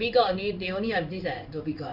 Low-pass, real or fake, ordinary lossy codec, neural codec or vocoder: 5.4 kHz; fake; none; codec, 16 kHz in and 24 kHz out, 1 kbps, XY-Tokenizer